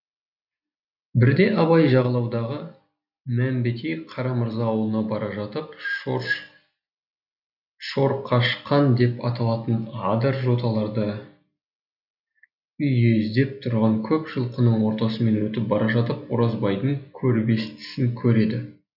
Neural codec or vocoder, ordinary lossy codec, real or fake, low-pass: none; none; real; 5.4 kHz